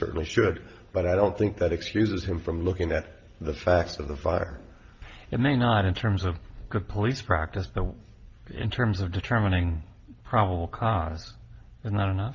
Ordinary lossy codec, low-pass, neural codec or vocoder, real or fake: Opus, 24 kbps; 7.2 kHz; none; real